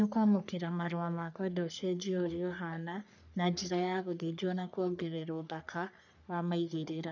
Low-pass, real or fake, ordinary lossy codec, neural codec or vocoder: 7.2 kHz; fake; none; codec, 44.1 kHz, 3.4 kbps, Pupu-Codec